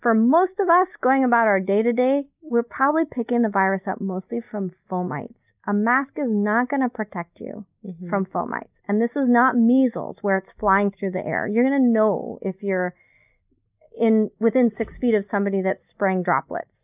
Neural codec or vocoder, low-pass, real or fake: none; 3.6 kHz; real